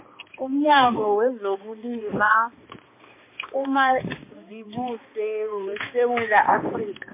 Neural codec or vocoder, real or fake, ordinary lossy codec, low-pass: codec, 16 kHz, 2 kbps, X-Codec, HuBERT features, trained on balanced general audio; fake; MP3, 24 kbps; 3.6 kHz